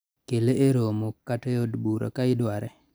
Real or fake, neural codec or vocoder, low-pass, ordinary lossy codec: real; none; none; none